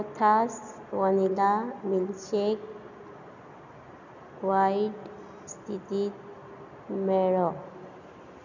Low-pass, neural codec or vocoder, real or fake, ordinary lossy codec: 7.2 kHz; none; real; none